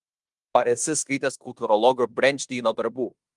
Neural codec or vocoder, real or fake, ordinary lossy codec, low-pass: codec, 16 kHz in and 24 kHz out, 0.9 kbps, LongCat-Audio-Codec, fine tuned four codebook decoder; fake; Opus, 32 kbps; 10.8 kHz